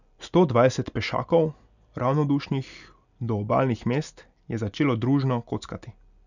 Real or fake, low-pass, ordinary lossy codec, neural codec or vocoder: real; 7.2 kHz; none; none